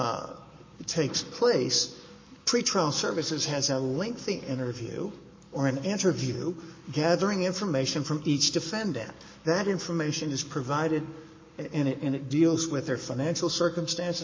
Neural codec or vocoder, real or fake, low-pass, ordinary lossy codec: codec, 44.1 kHz, 7.8 kbps, Pupu-Codec; fake; 7.2 kHz; MP3, 32 kbps